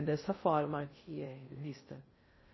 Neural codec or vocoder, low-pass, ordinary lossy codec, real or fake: codec, 16 kHz in and 24 kHz out, 0.6 kbps, FocalCodec, streaming, 2048 codes; 7.2 kHz; MP3, 24 kbps; fake